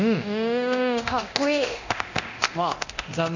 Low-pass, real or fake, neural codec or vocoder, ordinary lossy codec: 7.2 kHz; fake; codec, 24 kHz, 0.9 kbps, DualCodec; none